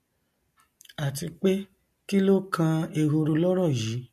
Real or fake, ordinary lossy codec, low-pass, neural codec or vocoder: real; MP3, 64 kbps; 14.4 kHz; none